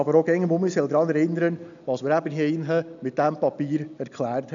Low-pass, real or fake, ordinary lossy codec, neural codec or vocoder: 7.2 kHz; real; none; none